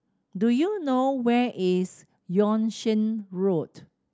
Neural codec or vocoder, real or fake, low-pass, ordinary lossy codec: none; real; none; none